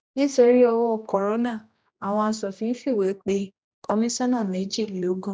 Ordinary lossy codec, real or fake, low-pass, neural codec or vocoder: none; fake; none; codec, 16 kHz, 1 kbps, X-Codec, HuBERT features, trained on general audio